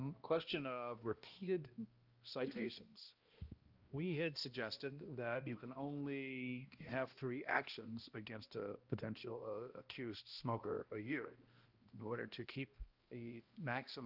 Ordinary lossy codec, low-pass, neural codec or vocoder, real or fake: Opus, 24 kbps; 5.4 kHz; codec, 16 kHz, 1 kbps, X-Codec, HuBERT features, trained on balanced general audio; fake